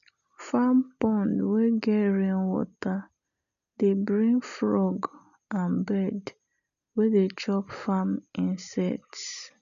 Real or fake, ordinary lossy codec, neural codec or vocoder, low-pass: real; none; none; 7.2 kHz